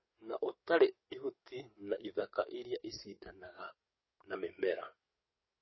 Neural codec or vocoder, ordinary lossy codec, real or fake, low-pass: codec, 44.1 kHz, 7.8 kbps, DAC; MP3, 24 kbps; fake; 7.2 kHz